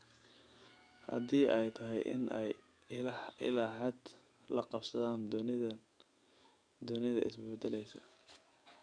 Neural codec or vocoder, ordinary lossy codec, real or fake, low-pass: codec, 44.1 kHz, 7.8 kbps, DAC; none; fake; 9.9 kHz